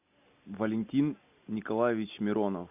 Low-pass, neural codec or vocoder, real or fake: 3.6 kHz; none; real